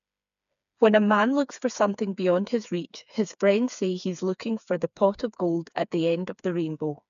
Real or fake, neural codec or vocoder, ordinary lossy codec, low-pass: fake; codec, 16 kHz, 4 kbps, FreqCodec, smaller model; none; 7.2 kHz